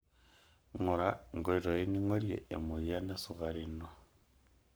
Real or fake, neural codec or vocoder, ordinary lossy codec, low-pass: fake; codec, 44.1 kHz, 7.8 kbps, Pupu-Codec; none; none